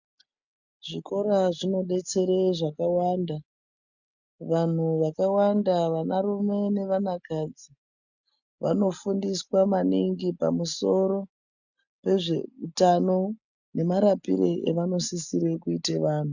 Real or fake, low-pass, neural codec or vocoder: real; 7.2 kHz; none